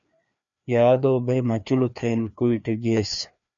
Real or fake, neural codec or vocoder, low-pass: fake; codec, 16 kHz, 2 kbps, FreqCodec, larger model; 7.2 kHz